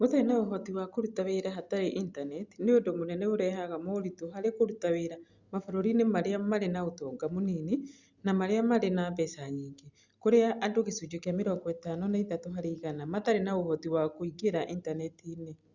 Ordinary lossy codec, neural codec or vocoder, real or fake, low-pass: Opus, 64 kbps; none; real; 7.2 kHz